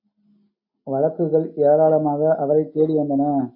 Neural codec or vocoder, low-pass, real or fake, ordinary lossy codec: none; 5.4 kHz; real; AAC, 48 kbps